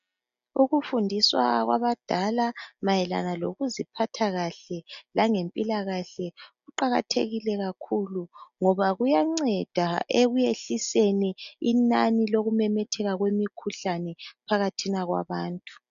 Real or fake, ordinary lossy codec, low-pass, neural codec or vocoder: real; MP3, 96 kbps; 7.2 kHz; none